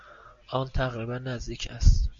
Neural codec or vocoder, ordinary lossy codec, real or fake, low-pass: none; MP3, 48 kbps; real; 7.2 kHz